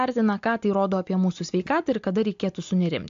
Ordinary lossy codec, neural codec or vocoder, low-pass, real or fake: AAC, 64 kbps; none; 7.2 kHz; real